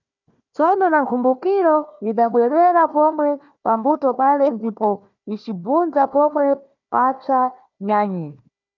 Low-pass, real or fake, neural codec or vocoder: 7.2 kHz; fake; codec, 16 kHz, 1 kbps, FunCodec, trained on Chinese and English, 50 frames a second